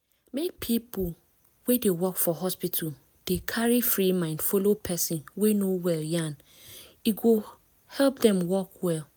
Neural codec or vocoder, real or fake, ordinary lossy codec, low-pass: none; real; none; none